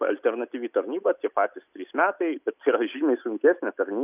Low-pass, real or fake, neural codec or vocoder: 3.6 kHz; real; none